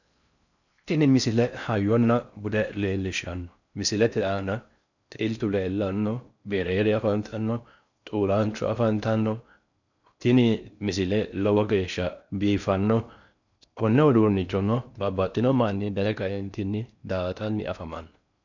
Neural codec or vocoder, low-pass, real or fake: codec, 16 kHz in and 24 kHz out, 0.6 kbps, FocalCodec, streaming, 4096 codes; 7.2 kHz; fake